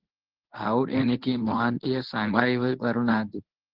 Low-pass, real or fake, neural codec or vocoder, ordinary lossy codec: 5.4 kHz; fake; codec, 24 kHz, 0.9 kbps, WavTokenizer, medium speech release version 1; Opus, 16 kbps